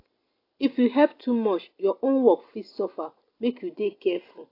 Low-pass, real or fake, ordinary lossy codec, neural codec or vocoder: 5.4 kHz; real; AAC, 32 kbps; none